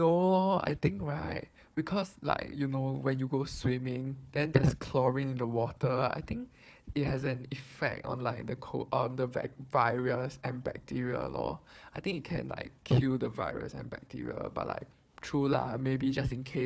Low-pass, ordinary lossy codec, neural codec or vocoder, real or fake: none; none; codec, 16 kHz, 4 kbps, FunCodec, trained on Chinese and English, 50 frames a second; fake